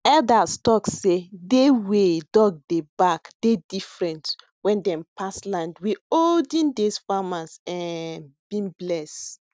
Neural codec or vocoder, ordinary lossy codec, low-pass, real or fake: none; none; none; real